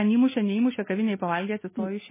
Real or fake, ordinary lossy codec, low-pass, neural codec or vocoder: real; MP3, 16 kbps; 3.6 kHz; none